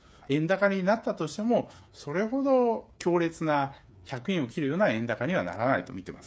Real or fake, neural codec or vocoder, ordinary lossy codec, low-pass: fake; codec, 16 kHz, 8 kbps, FreqCodec, smaller model; none; none